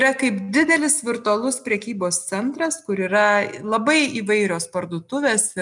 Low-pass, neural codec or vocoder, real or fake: 10.8 kHz; none; real